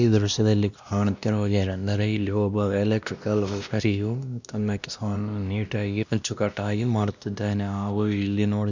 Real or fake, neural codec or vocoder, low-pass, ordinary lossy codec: fake; codec, 16 kHz, 1 kbps, X-Codec, WavLM features, trained on Multilingual LibriSpeech; 7.2 kHz; none